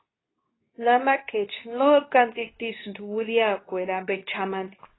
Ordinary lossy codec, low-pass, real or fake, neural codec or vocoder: AAC, 16 kbps; 7.2 kHz; fake; codec, 24 kHz, 0.9 kbps, WavTokenizer, medium speech release version 2